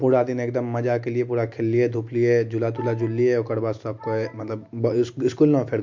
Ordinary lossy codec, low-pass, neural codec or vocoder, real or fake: MP3, 48 kbps; 7.2 kHz; none; real